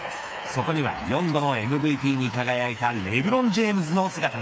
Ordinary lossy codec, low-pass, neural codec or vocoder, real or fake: none; none; codec, 16 kHz, 4 kbps, FreqCodec, smaller model; fake